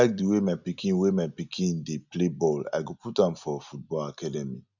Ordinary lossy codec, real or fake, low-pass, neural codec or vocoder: none; real; 7.2 kHz; none